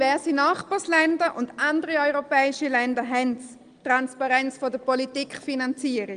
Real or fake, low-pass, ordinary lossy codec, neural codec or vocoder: real; 9.9 kHz; Opus, 24 kbps; none